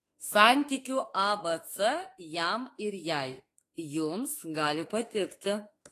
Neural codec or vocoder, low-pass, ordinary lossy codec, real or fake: autoencoder, 48 kHz, 32 numbers a frame, DAC-VAE, trained on Japanese speech; 14.4 kHz; AAC, 48 kbps; fake